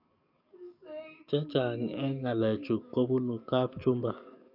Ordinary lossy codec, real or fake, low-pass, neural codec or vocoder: none; fake; 5.4 kHz; codec, 44.1 kHz, 7.8 kbps, DAC